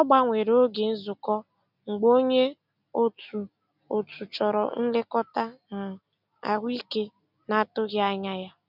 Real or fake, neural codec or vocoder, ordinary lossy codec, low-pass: real; none; none; 5.4 kHz